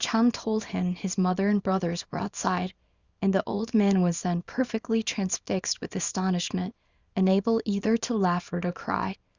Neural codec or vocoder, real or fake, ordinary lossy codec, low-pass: codec, 24 kHz, 0.9 kbps, WavTokenizer, small release; fake; Opus, 64 kbps; 7.2 kHz